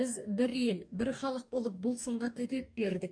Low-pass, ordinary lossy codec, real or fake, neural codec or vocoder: 9.9 kHz; none; fake; codec, 44.1 kHz, 2.6 kbps, DAC